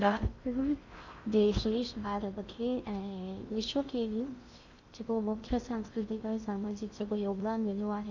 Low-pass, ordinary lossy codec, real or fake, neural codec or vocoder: 7.2 kHz; none; fake; codec, 16 kHz in and 24 kHz out, 0.6 kbps, FocalCodec, streaming, 4096 codes